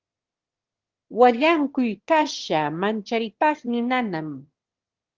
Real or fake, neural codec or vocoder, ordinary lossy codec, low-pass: fake; autoencoder, 22.05 kHz, a latent of 192 numbers a frame, VITS, trained on one speaker; Opus, 16 kbps; 7.2 kHz